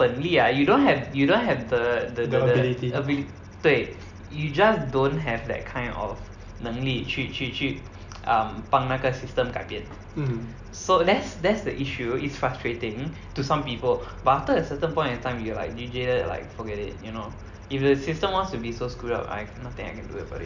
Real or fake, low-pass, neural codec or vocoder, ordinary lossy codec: real; 7.2 kHz; none; none